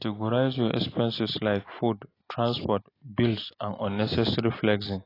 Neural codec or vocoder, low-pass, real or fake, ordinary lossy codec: none; 5.4 kHz; real; AAC, 24 kbps